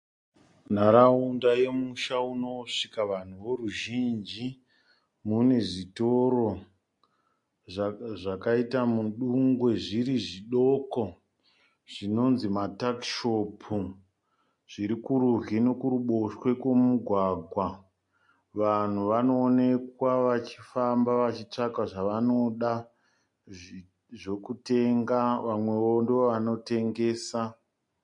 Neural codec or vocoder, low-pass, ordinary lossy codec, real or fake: none; 10.8 kHz; MP3, 48 kbps; real